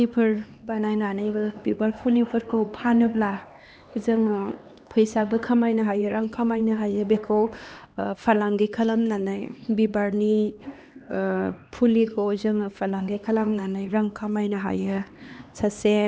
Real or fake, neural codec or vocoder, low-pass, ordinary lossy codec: fake; codec, 16 kHz, 2 kbps, X-Codec, HuBERT features, trained on LibriSpeech; none; none